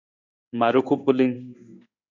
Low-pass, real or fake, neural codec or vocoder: 7.2 kHz; fake; codec, 16 kHz in and 24 kHz out, 1 kbps, XY-Tokenizer